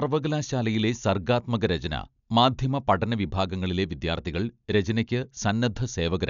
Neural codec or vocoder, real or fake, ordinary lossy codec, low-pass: none; real; none; 7.2 kHz